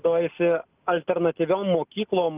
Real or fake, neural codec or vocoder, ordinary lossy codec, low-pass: real; none; Opus, 24 kbps; 3.6 kHz